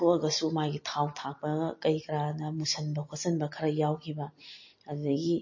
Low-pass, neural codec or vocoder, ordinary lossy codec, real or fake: 7.2 kHz; none; MP3, 32 kbps; real